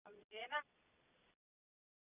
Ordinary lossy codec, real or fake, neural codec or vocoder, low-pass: none; fake; vocoder, 22.05 kHz, 80 mel bands, Vocos; 3.6 kHz